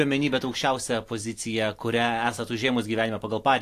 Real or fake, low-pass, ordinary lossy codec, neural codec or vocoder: real; 14.4 kHz; AAC, 64 kbps; none